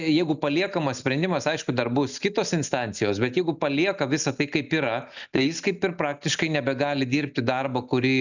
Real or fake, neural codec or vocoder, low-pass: real; none; 7.2 kHz